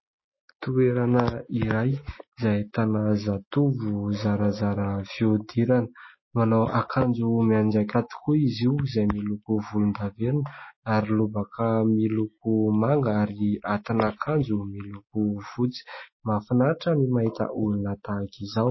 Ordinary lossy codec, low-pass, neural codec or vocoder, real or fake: MP3, 24 kbps; 7.2 kHz; none; real